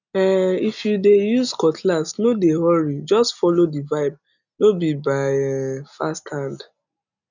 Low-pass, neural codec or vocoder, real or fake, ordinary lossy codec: 7.2 kHz; none; real; none